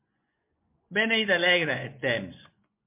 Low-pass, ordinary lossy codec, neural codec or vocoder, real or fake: 3.6 kHz; MP3, 32 kbps; vocoder, 24 kHz, 100 mel bands, Vocos; fake